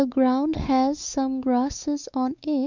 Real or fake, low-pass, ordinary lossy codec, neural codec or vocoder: fake; 7.2 kHz; none; codec, 16 kHz, 4 kbps, X-Codec, WavLM features, trained on Multilingual LibriSpeech